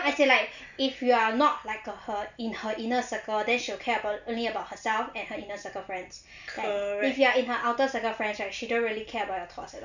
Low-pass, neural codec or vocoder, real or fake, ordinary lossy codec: 7.2 kHz; none; real; none